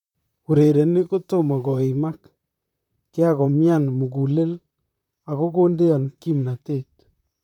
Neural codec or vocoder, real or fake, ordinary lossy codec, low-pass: vocoder, 44.1 kHz, 128 mel bands, Pupu-Vocoder; fake; none; 19.8 kHz